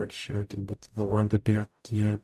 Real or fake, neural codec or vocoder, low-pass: fake; codec, 44.1 kHz, 0.9 kbps, DAC; 14.4 kHz